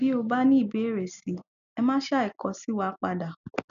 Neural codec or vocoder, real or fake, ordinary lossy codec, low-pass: none; real; none; 7.2 kHz